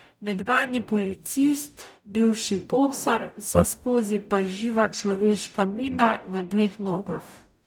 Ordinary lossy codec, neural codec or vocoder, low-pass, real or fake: none; codec, 44.1 kHz, 0.9 kbps, DAC; 19.8 kHz; fake